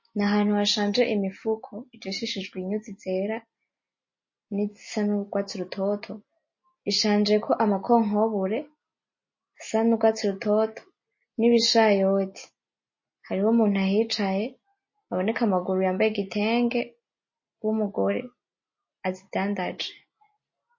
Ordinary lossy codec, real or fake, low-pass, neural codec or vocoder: MP3, 32 kbps; real; 7.2 kHz; none